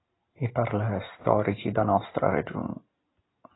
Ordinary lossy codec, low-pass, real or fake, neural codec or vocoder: AAC, 16 kbps; 7.2 kHz; real; none